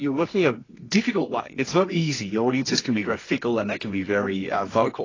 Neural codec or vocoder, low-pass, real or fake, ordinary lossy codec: codec, 24 kHz, 0.9 kbps, WavTokenizer, medium music audio release; 7.2 kHz; fake; AAC, 32 kbps